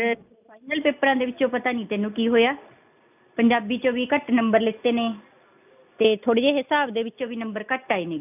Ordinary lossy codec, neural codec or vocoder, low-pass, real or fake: none; none; 3.6 kHz; real